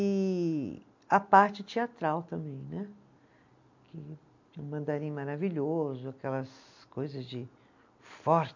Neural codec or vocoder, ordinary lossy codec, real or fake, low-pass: none; MP3, 48 kbps; real; 7.2 kHz